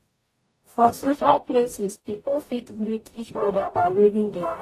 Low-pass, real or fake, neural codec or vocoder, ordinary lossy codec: 14.4 kHz; fake; codec, 44.1 kHz, 0.9 kbps, DAC; AAC, 48 kbps